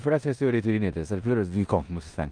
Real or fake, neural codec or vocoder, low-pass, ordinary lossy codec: fake; codec, 16 kHz in and 24 kHz out, 0.9 kbps, LongCat-Audio-Codec, four codebook decoder; 9.9 kHz; Opus, 32 kbps